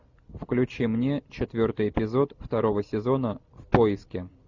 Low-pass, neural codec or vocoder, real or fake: 7.2 kHz; none; real